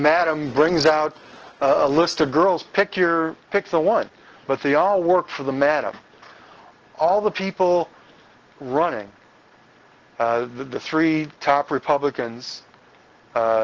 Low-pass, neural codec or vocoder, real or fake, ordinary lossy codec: 7.2 kHz; none; real; Opus, 16 kbps